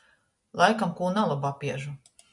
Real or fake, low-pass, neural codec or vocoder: real; 10.8 kHz; none